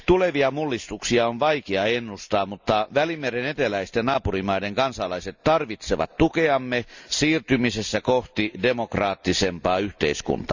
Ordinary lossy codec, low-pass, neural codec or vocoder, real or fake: Opus, 64 kbps; 7.2 kHz; none; real